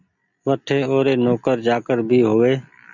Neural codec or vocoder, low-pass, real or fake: none; 7.2 kHz; real